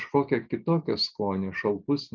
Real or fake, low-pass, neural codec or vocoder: real; 7.2 kHz; none